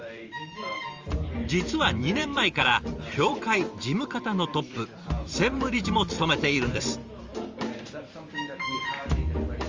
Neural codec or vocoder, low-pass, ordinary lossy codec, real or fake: vocoder, 44.1 kHz, 128 mel bands every 512 samples, BigVGAN v2; 7.2 kHz; Opus, 32 kbps; fake